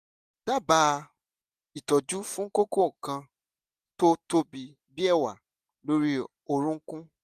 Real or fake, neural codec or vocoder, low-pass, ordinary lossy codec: real; none; 14.4 kHz; none